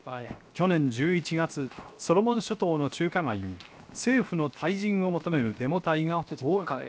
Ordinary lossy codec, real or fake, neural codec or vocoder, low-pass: none; fake; codec, 16 kHz, 0.7 kbps, FocalCodec; none